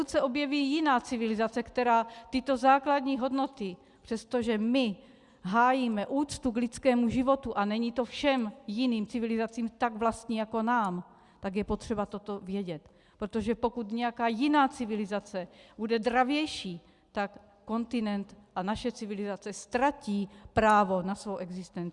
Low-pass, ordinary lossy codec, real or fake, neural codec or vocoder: 10.8 kHz; Opus, 64 kbps; real; none